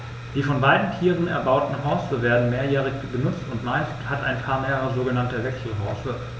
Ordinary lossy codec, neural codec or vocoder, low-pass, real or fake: none; none; none; real